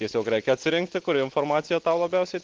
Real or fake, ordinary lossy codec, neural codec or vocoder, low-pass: real; Opus, 16 kbps; none; 7.2 kHz